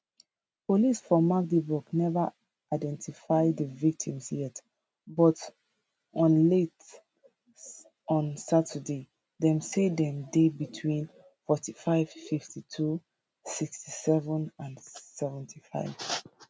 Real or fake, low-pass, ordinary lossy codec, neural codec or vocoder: real; none; none; none